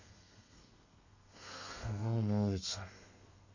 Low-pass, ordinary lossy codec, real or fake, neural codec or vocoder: 7.2 kHz; none; fake; codec, 32 kHz, 1.9 kbps, SNAC